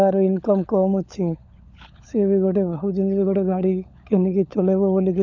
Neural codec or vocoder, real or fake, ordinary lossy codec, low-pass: codec, 16 kHz, 16 kbps, FunCodec, trained on LibriTTS, 50 frames a second; fake; none; 7.2 kHz